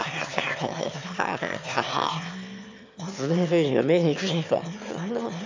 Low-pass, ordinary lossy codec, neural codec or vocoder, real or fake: 7.2 kHz; MP3, 64 kbps; autoencoder, 22.05 kHz, a latent of 192 numbers a frame, VITS, trained on one speaker; fake